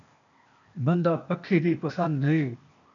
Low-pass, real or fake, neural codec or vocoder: 7.2 kHz; fake; codec, 16 kHz, 0.8 kbps, ZipCodec